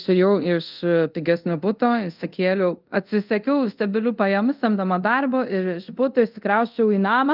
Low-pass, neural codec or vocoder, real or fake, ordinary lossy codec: 5.4 kHz; codec, 24 kHz, 0.5 kbps, DualCodec; fake; Opus, 24 kbps